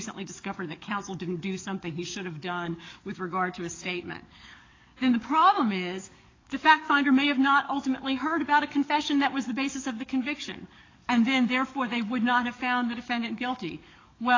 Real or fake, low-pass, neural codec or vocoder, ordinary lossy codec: fake; 7.2 kHz; codec, 44.1 kHz, 7.8 kbps, DAC; AAC, 32 kbps